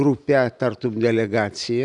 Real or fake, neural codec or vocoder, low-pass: real; none; 10.8 kHz